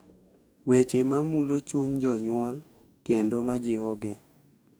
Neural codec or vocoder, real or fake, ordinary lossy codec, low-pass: codec, 44.1 kHz, 2.6 kbps, DAC; fake; none; none